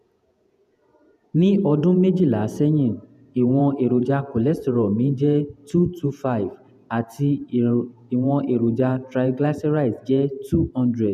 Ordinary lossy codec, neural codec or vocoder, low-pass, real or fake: none; vocoder, 48 kHz, 128 mel bands, Vocos; 14.4 kHz; fake